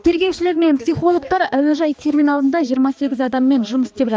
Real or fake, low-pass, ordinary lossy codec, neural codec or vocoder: fake; none; none; codec, 16 kHz, 2 kbps, X-Codec, HuBERT features, trained on balanced general audio